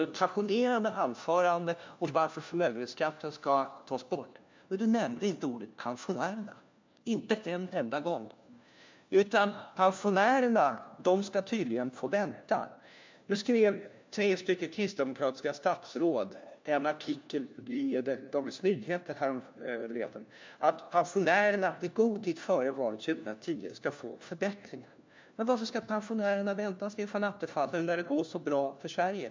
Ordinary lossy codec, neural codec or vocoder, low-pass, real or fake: MP3, 64 kbps; codec, 16 kHz, 1 kbps, FunCodec, trained on LibriTTS, 50 frames a second; 7.2 kHz; fake